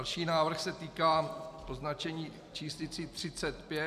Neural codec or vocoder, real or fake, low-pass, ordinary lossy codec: none; real; 14.4 kHz; MP3, 96 kbps